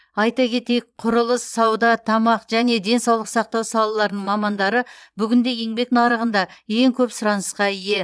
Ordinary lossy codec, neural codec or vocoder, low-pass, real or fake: none; vocoder, 22.05 kHz, 80 mel bands, Vocos; none; fake